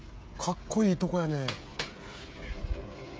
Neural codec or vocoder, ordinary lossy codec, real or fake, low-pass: codec, 16 kHz, 16 kbps, FreqCodec, smaller model; none; fake; none